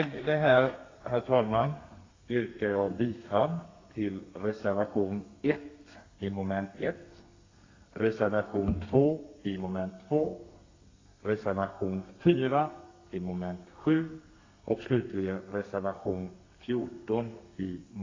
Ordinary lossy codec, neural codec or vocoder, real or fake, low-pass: AAC, 32 kbps; codec, 32 kHz, 1.9 kbps, SNAC; fake; 7.2 kHz